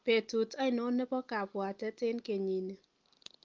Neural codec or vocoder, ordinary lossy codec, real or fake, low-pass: none; Opus, 32 kbps; real; 7.2 kHz